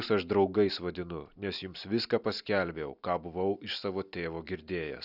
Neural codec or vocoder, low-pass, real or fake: none; 5.4 kHz; real